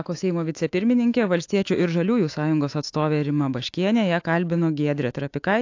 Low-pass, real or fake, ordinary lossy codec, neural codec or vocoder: 7.2 kHz; fake; AAC, 48 kbps; autoencoder, 48 kHz, 128 numbers a frame, DAC-VAE, trained on Japanese speech